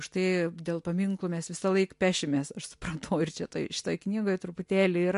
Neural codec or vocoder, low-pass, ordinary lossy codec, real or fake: none; 10.8 kHz; MP3, 64 kbps; real